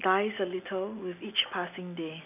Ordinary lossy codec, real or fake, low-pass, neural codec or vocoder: none; real; 3.6 kHz; none